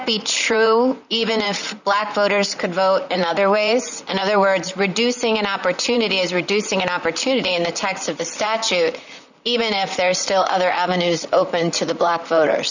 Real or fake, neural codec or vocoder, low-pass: fake; vocoder, 44.1 kHz, 128 mel bands, Pupu-Vocoder; 7.2 kHz